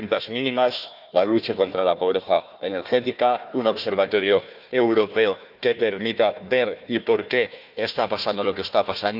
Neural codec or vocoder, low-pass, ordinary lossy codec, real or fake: codec, 16 kHz, 1 kbps, FunCodec, trained on Chinese and English, 50 frames a second; 5.4 kHz; none; fake